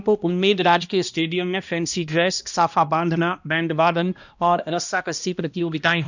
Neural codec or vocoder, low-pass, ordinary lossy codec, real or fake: codec, 16 kHz, 1 kbps, X-Codec, HuBERT features, trained on balanced general audio; 7.2 kHz; none; fake